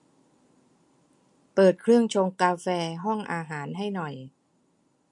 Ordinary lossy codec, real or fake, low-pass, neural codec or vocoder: MP3, 48 kbps; real; 10.8 kHz; none